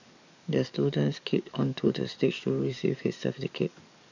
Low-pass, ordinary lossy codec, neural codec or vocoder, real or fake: 7.2 kHz; none; codec, 44.1 kHz, 7.8 kbps, DAC; fake